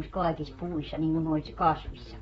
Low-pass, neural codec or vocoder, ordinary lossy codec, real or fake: 19.8 kHz; vocoder, 44.1 kHz, 128 mel bands, Pupu-Vocoder; AAC, 24 kbps; fake